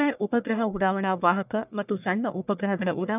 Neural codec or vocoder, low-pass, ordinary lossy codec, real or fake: codec, 44.1 kHz, 1.7 kbps, Pupu-Codec; 3.6 kHz; none; fake